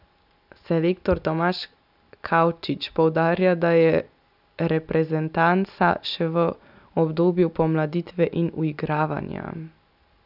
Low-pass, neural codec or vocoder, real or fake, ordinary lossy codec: 5.4 kHz; none; real; none